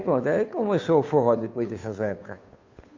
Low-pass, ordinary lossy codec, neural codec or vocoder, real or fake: 7.2 kHz; MP3, 48 kbps; codec, 16 kHz, 2 kbps, FunCodec, trained on Chinese and English, 25 frames a second; fake